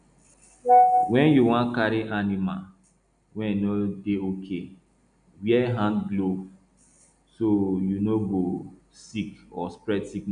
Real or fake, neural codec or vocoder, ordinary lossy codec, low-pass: real; none; none; 9.9 kHz